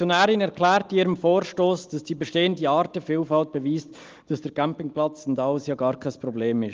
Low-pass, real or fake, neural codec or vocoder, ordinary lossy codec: 7.2 kHz; real; none; Opus, 24 kbps